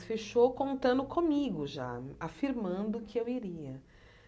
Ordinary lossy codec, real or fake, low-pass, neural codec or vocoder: none; real; none; none